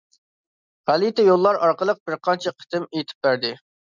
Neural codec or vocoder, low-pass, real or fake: none; 7.2 kHz; real